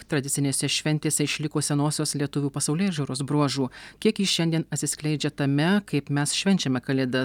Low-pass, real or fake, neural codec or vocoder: 19.8 kHz; real; none